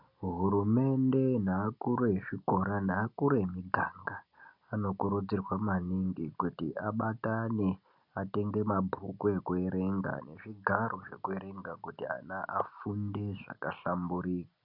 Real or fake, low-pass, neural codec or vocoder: real; 5.4 kHz; none